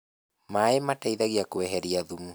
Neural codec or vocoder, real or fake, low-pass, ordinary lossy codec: none; real; none; none